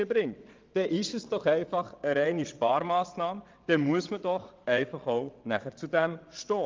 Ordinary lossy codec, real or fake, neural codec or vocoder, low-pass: Opus, 32 kbps; fake; vocoder, 22.05 kHz, 80 mel bands, WaveNeXt; 7.2 kHz